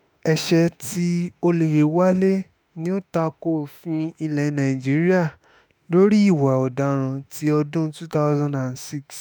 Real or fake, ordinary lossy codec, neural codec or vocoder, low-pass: fake; none; autoencoder, 48 kHz, 32 numbers a frame, DAC-VAE, trained on Japanese speech; none